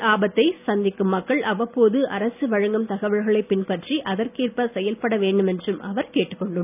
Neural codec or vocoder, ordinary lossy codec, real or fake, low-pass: none; none; real; 3.6 kHz